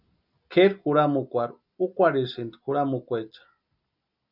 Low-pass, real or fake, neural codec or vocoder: 5.4 kHz; real; none